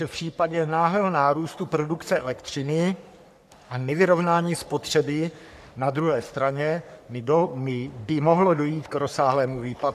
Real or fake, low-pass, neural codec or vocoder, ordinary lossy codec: fake; 14.4 kHz; codec, 44.1 kHz, 3.4 kbps, Pupu-Codec; AAC, 96 kbps